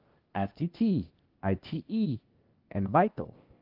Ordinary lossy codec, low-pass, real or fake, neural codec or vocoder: Opus, 24 kbps; 5.4 kHz; fake; codec, 16 kHz, 0.8 kbps, ZipCodec